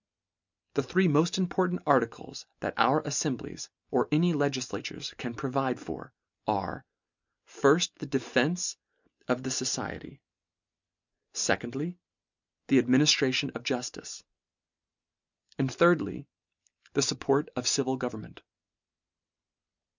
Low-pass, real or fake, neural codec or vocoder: 7.2 kHz; real; none